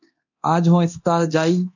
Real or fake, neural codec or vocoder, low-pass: fake; codec, 24 kHz, 0.9 kbps, DualCodec; 7.2 kHz